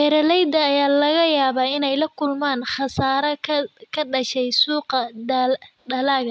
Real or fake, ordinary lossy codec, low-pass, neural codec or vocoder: real; none; none; none